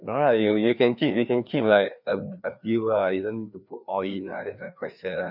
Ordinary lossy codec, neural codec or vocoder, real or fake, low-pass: none; codec, 16 kHz, 2 kbps, FreqCodec, larger model; fake; 5.4 kHz